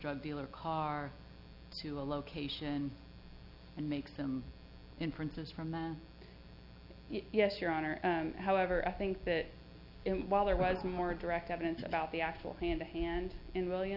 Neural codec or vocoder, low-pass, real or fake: none; 5.4 kHz; real